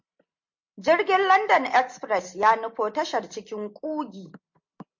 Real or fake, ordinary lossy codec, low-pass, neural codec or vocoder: real; MP3, 48 kbps; 7.2 kHz; none